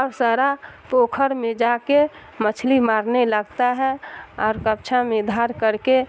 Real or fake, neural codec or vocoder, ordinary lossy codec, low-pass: real; none; none; none